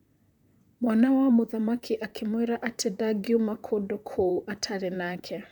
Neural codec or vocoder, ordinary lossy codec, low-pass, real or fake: vocoder, 44.1 kHz, 128 mel bands, Pupu-Vocoder; none; 19.8 kHz; fake